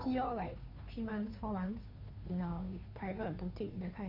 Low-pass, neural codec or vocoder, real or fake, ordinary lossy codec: 5.4 kHz; codec, 16 kHz, 2 kbps, FunCodec, trained on Chinese and English, 25 frames a second; fake; none